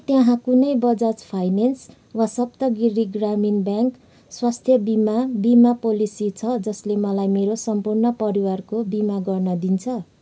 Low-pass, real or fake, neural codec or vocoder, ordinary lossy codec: none; real; none; none